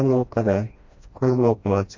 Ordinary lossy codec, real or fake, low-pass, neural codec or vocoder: MP3, 48 kbps; fake; 7.2 kHz; codec, 16 kHz, 1 kbps, FreqCodec, smaller model